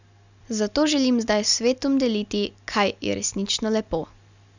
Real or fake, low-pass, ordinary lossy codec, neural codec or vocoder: real; 7.2 kHz; none; none